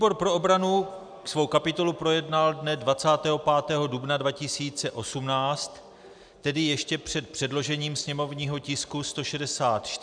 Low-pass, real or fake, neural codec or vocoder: 9.9 kHz; real; none